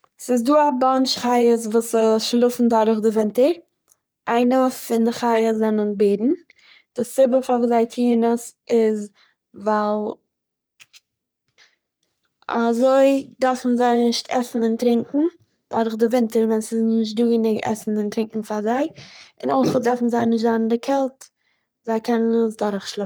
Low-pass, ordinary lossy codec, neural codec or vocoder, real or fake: none; none; codec, 44.1 kHz, 3.4 kbps, Pupu-Codec; fake